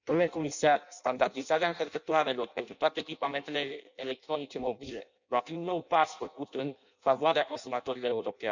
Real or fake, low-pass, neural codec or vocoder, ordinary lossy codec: fake; 7.2 kHz; codec, 16 kHz in and 24 kHz out, 0.6 kbps, FireRedTTS-2 codec; none